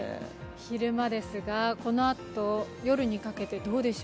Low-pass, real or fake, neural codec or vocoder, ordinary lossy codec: none; real; none; none